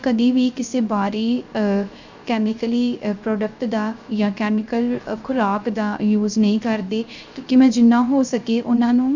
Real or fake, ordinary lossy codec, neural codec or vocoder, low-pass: fake; Opus, 64 kbps; codec, 16 kHz, 0.3 kbps, FocalCodec; 7.2 kHz